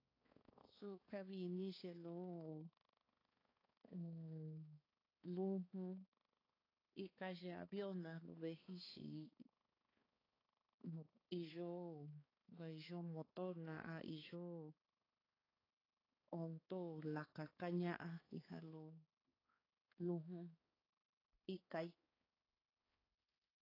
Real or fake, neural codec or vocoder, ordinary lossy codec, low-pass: fake; codec, 16 kHz, 4 kbps, X-Codec, HuBERT features, trained on balanced general audio; AAC, 24 kbps; 5.4 kHz